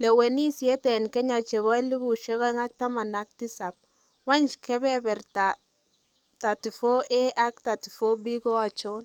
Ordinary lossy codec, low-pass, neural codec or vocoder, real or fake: none; 19.8 kHz; codec, 44.1 kHz, 7.8 kbps, DAC; fake